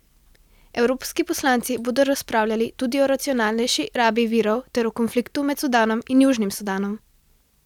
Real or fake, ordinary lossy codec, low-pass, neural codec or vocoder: real; none; 19.8 kHz; none